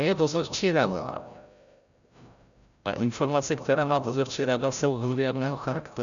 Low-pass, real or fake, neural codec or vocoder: 7.2 kHz; fake; codec, 16 kHz, 0.5 kbps, FreqCodec, larger model